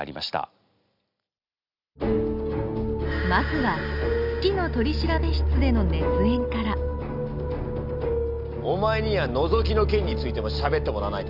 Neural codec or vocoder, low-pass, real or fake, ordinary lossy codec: none; 5.4 kHz; real; none